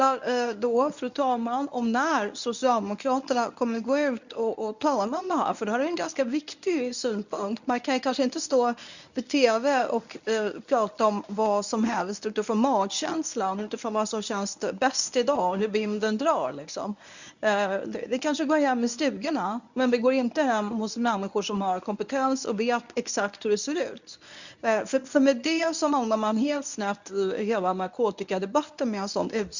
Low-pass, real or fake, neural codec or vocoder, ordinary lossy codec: 7.2 kHz; fake; codec, 24 kHz, 0.9 kbps, WavTokenizer, medium speech release version 1; none